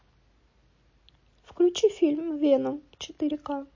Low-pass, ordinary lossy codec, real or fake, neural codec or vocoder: 7.2 kHz; MP3, 32 kbps; real; none